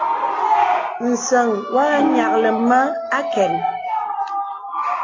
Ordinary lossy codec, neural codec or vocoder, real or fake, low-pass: AAC, 32 kbps; none; real; 7.2 kHz